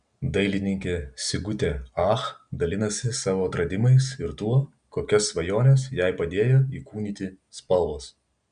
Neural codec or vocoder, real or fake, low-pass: none; real; 9.9 kHz